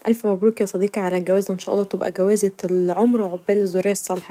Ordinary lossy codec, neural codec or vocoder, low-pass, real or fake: MP3, 96 kbps; codec, 44.1 kHz, 7.8 kbps, DAC; 19.8 kHz; fake